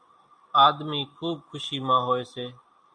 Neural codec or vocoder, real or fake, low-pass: none; real; 9.9 kHz